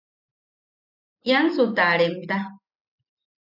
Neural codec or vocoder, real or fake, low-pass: none; real; 5.4 kHz